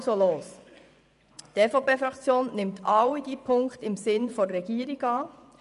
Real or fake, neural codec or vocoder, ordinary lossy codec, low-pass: fake; vocoder, 24 kHz, 100 mel bands, Vocos; none; 10.8 kHz